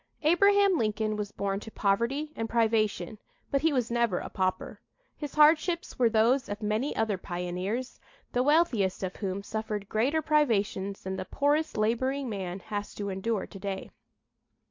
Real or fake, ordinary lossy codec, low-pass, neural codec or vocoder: real; MP3, 48 kbps; 7.2 kHz; none